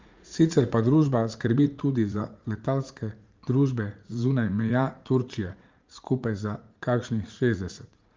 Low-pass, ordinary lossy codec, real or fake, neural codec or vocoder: 7.2 kHz; Opus, 32 kbps; fake; vocoder, 44.1 kHz, 80 mel bands, Vocos